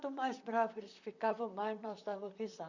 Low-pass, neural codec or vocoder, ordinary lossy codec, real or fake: 7.2 kHz; vocoder, 44.1 kHz, 128 mel bands, Pupu-Vocoder; none; fake